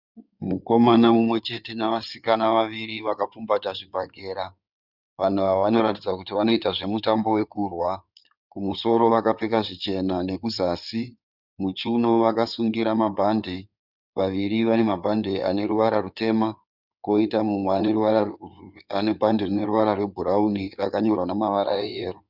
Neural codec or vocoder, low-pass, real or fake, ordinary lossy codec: codec, 16 kHz in and 24 kHz out, 2.2 kbps, FireRedTTS-2 codec; 5.4 kHz; fake; Opus, 64 kbps